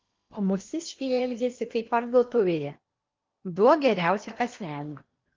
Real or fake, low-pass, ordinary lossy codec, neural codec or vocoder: fake; 7.2 kHz; Opus, 24 kbps; codec, 16 kHz in and 24 kHz out, 0.8 kbps, FocalCodec, streaming, 65536 codes